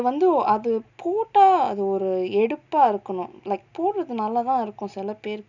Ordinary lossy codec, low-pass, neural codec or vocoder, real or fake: none; 7.2 kHz; none; real